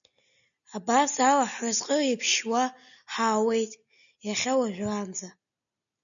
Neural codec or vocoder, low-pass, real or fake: none; 7.2 kHz; real